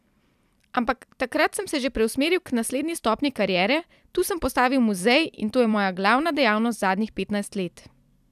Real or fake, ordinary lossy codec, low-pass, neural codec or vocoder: fake; none; 14.4 kHz; vocoder, 44.1 kHz, 128 mel bands every 256 samples, BigVGAN v2